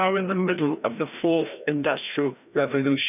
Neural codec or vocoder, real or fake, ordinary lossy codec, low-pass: codec, 16 kHz, 1 kbps, FreqCodec, larger model; fake; none; 3.6 kHz